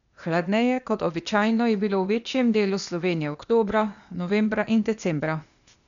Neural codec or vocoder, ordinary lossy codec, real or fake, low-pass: codec, 16 kHz, 0.8 kbps, ZipCodec; none; fake; 7.2 kHz